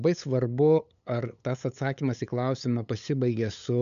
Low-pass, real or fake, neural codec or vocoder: 7.2 kHz; fake; codec, 16 kHz, 8 kbps, FunCodec, trained on LibriTTS, 25 frames a second